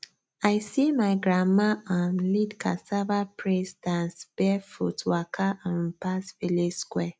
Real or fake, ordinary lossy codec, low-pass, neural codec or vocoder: real; none; none; none